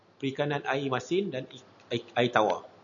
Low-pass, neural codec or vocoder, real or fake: 7.2 kHz; none; real